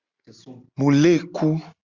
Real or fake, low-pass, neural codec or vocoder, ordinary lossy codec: real; 7.2 kHz; none; Opus, 64 kbps